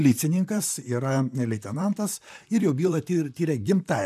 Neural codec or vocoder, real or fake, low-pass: vocoder, 44.1 kHz, 128 mel bands, Pupu-Vocoder; fake; 14.4 kHz